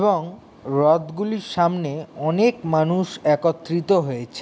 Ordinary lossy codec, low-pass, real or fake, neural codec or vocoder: none; none; real; none